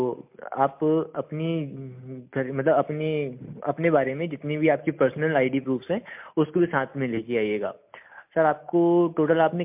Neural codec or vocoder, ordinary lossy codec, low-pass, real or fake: none; MP3, 32 kbps; 3.6 kHz; real